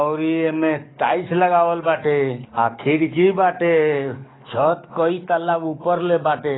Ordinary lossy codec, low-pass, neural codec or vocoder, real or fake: AAC, 16 kbps; 7.2 kHz; codec, 44.1 kHz, 7.8 kbps, DAC; fake